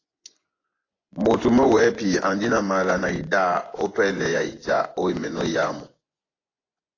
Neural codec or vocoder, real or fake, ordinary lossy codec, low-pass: vocoder, 24 kHz, 100 mel bands, Vocos; fake; AAC, 32 kbps; 7.2 kHz